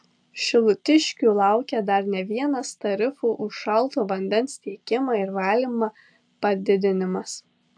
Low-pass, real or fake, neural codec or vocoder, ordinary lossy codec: 9.9 kHz; real; none; AAC, 64 kbps